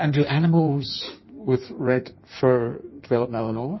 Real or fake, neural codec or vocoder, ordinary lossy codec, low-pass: fake; codec, 16 kHz in and 24 kHz out, 1.1 kbps, FireRedTTS-2 codec; MP3, 24 kbps; 7.2 kHz